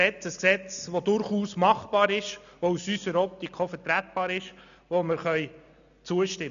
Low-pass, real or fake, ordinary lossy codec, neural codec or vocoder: 7.2 kHz; real; none; none